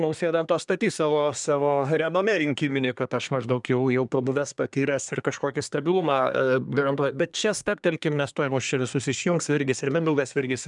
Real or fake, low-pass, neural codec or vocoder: fake; 10.8 kHz; codec, 24 kHz, 1 kbps, SNAC